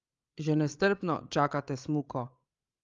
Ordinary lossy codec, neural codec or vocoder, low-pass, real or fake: Opus, 32 kbps; codec, 16 kHz, 8 kbps, FreqCodec, larger model; 7.2 kHz; fake